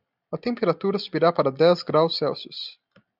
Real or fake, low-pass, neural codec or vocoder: real; 5.4 kHz; none